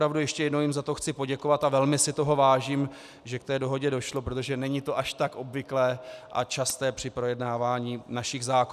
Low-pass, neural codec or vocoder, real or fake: 14.4 kHz; none; real